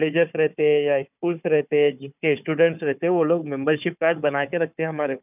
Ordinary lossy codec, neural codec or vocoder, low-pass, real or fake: none; codec, 16 kHz, 4 kbps, FunCodec, trained on Chinese and English, 50 frames a second; 3.6 kHz; fake